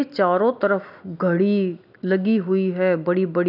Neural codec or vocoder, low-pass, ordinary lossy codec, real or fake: none; 5.4 kHz; none; real